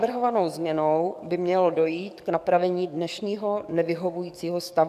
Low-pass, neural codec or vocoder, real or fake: 14.4 kHz; codec, 44.1 kHz, 7.8 kbps, DAC; fake